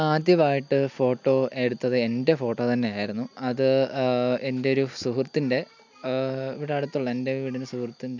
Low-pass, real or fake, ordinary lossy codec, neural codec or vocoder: 7.2 kHz; real; none; none